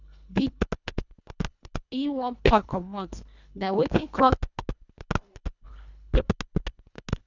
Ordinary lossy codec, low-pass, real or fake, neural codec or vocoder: none; 7.2 kHz; fake; codec, 24 kHz, 1.5 kbps, HILCodec